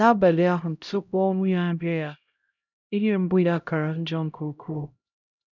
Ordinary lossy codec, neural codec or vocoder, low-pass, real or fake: none; codec, 16 kHz, 0.5 kbps, X-Codec, HuBERT features, trained on LibriSpeech; 7.2 kHz; fake